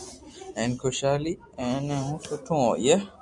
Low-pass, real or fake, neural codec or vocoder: 10.8 kHz; real; none